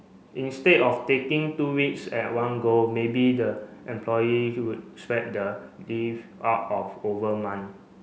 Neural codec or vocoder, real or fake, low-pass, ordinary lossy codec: none; real; none; none